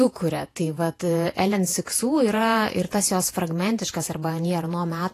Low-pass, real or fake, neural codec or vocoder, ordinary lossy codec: 14.4 kHz; fake; vocoder, 48 kHz, 128 mel bands, Vocos; AAC, 48 kbps